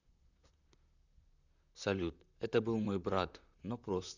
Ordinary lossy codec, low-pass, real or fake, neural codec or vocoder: none; 7.2 kHz; fake; vocoder, 44.1 kHz, 128 mel bands, Pupu-Vocoder